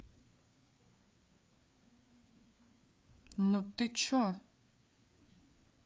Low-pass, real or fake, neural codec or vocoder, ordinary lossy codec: none; fake; codec, 16 kHz, 4 kbps, FreqCodec, larger model; none